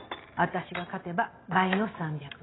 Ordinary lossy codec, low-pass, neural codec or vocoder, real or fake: AAC, 16 kbps; 7.2 kHz; none; real